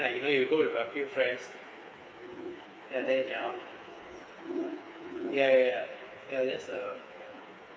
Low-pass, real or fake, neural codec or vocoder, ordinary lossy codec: none; fake; codec, 16 kHz, 4 kbps, FreqCodec, smaller model; none